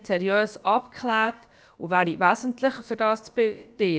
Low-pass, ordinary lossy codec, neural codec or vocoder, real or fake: none; none; codec, 16 kHz, about 1 kbps, DyCAST, with the encoder's durations; fake